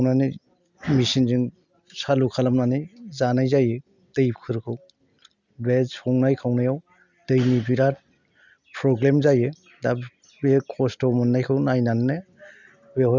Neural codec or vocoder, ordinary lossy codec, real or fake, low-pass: none; none; real; 7.2 kHz